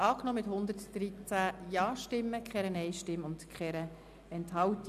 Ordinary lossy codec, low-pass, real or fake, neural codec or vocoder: AAC, 96 kbps; 14.4 kHz; real; none